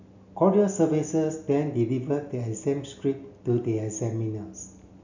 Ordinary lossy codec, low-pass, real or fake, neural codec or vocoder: none; 7.2 kHz; real; none